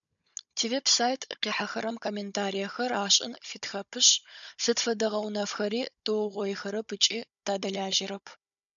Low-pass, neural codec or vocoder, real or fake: 7.2 kHz; codec, 16 kHz, 16 kbps, FunCodec, trained on Chinese and English, 50 frames a second; fake